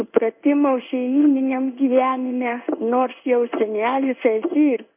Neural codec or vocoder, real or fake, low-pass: codec, 16 kHz in and 24 kHz out, 1 kbps, XY-Tokenizer; fake; 3.6 kHz